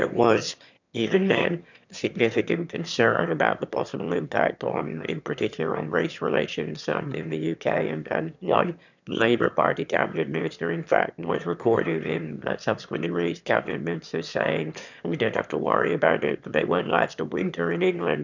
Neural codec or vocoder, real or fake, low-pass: autoencoder, 22.05 kHz, a latent of 192 numbers a frame, VITS, trained on one speaker; fake; 7.2 kHz